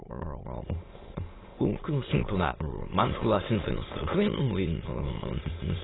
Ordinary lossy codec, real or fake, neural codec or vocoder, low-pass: AAC, 16 kbps; fake; autoencoder, 22.05 kHz, a latent of 192 numbers a frame, VITS, trained on many speakers; 7.2 kHz